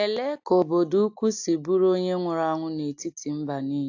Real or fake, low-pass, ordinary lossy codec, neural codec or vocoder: real; 7.2 kHz; AAC, 48 kbps; none